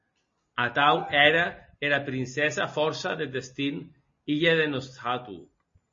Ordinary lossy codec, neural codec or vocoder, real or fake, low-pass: MP3, 32 kbps; none; real; 7.2 kHz